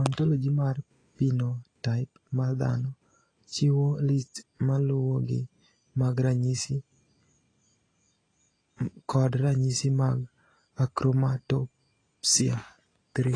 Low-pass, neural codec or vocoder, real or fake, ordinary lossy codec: 9.9 kHz; none; real; AAC, 32 kbps